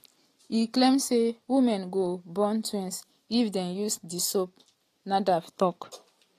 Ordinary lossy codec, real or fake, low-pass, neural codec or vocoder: AAC, 48 kbps; real; 14.4 kHz; none